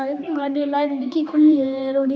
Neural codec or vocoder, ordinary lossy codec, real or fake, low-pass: codec, 16 kHz, 2 kbps, X-Codec, HuBERT features, trained on general audio; none; fake; none